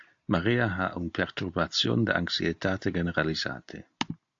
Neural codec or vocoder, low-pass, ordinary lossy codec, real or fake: none; 7.2 kHz; AAC, 64 kbps; real